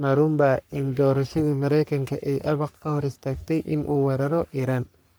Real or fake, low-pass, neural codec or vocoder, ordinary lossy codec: fake; none; codec, 44.1 kHz, 3.4 kbps, Pupu-Codec; none